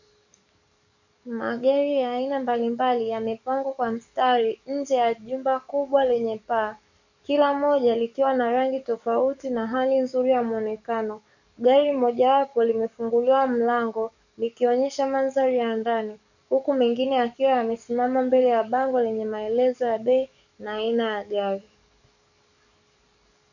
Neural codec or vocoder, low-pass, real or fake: codec, 44.1 kHz, 7.8 kbps, DAC; 7.2 kHz; fake